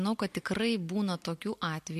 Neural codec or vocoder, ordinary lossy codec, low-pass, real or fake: none; MP3, 64 kbps; 14.4 kHz; real